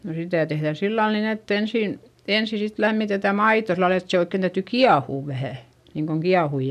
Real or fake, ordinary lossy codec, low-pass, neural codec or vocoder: real; none; 14.4 kHz; none